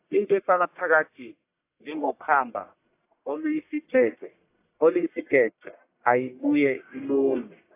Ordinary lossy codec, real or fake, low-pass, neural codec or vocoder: AAC, 24 kbps; fake; 3.6 kHz; codec, 44.1 kHz, 1.7 kbps, Pupu-Codec